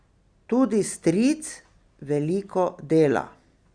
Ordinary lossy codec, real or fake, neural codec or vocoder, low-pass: Opus, 64 kbps; real; none; 9.9 kHz